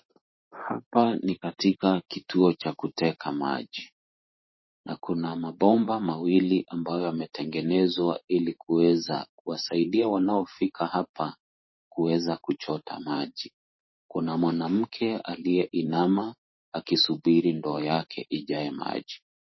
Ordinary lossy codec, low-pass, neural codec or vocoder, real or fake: MP3, 24 kbps; 7.2 kHz; vocoder, 44.1 kHz, 128 mel bands every 512 samples, BigVGAN v2; fake